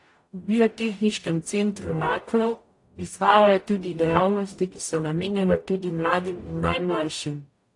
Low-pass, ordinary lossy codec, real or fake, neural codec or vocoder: 10.8 kHz; AAC, 48 kbps; fake; codec, 44.1 kHz, 0.9 kbps, DAC